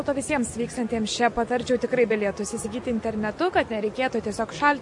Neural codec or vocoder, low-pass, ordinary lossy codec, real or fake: none; 10.8 kHz; MP3, 48 kbps; real